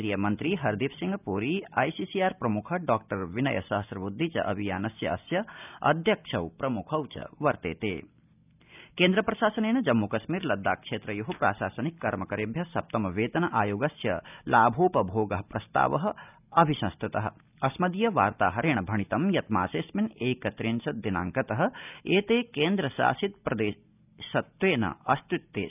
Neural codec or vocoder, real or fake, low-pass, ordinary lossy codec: none; real; 3.6 kHz; none